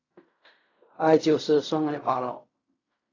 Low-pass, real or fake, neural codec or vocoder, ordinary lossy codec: 7.2 kHz; fake; codec, 16 kHz in and 24 kHz out, 0.4 kbps, LongCat-Audio-Codec, fine tuned four codebook decoder; AAC, 32 kbps